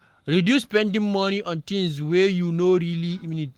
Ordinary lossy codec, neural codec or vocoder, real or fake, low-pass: Opus, 24 kbps; codec, 44.1 kHz, 7.8 kbps, Pupu-Codec; fake; 19.8 kHz